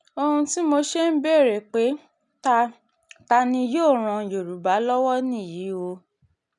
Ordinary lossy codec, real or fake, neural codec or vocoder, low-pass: none; real; none; 10.8 kHz